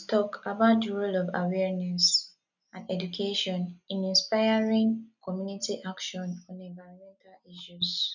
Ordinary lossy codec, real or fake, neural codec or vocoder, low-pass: none; real; none; 7.2 kHz